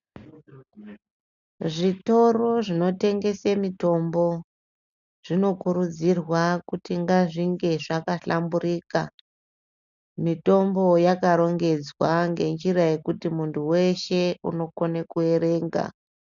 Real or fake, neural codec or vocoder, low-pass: real; none; 7.2 kHz